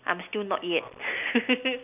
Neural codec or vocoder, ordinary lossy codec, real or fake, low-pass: none; none; real; 3.6 kHz